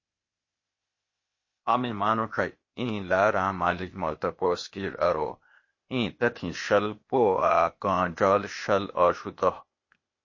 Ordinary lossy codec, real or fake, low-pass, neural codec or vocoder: MP3, 32 kbps; fake; 7.2 kHz; codec, 16 kHz, 0.8 kbps, ZipCodec